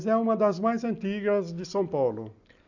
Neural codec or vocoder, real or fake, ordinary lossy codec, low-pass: none; real; none; 7.2 kHz